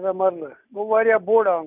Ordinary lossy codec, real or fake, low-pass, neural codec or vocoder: none; real; 3.6 kHz; none